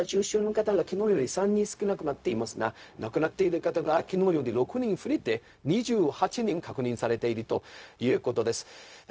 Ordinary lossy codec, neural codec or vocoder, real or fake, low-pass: none; codec, 16 kHz, 0.4 kbps, LongCat-Audio-Codec; fake; none